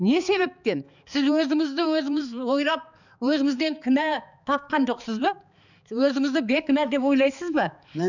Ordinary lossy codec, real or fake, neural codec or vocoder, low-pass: none; fake; codec, 16 kHz, 4 kbps, X-Codec, HuBERT features, trained on balanced general audio; 7.2 kHz